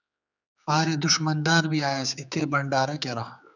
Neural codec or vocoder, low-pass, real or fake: codec, 16 kHz, 4 kbps, X-Codec, HuBERT features, trained on general audio; 7.2 kHz; fake